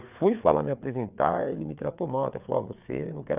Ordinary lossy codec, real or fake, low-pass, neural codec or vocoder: none; fake; 3.6 kHz; codec, 16 kHz, 4 kbps, FreqCodec, larger model